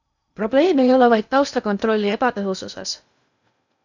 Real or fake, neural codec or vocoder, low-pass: fake; codec, 16 kHz in and 24 kHz out, 0.6 kbps, FocalCodec, streaming, 2048 codes; 7.2 kHz